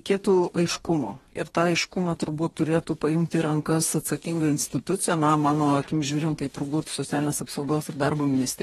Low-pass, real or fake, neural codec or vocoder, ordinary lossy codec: 19.8 kHz; fake; codec, 44.1 kHz, 2.6 kbps, DAC; AAC, 32 kbps